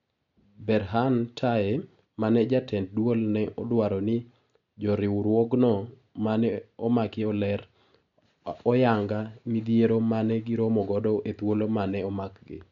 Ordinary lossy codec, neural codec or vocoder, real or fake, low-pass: none; none; real; 7.2 kHz